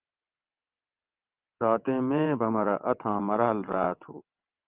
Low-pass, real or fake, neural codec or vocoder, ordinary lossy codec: 3.6 kHz; fake; vocoder, 22.05 kHz, 80 mel bands, WaveNeXt; Opus, 24 kbps